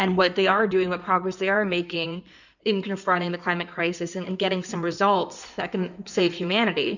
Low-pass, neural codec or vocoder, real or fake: 7.2 kHz; codec, 16 kHz in and 24 kHz out, 2.2 kbps, FireRedTTS-2 codec; fake